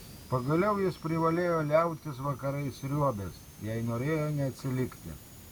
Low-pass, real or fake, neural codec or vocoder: 19.8 kHz; real; none